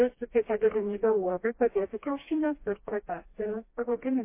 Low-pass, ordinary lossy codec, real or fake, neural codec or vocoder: 3.6 kHz; MP3, 24 kbps; fake; codec, 16 kHz, 1 kbps, FreqCodec, smaller model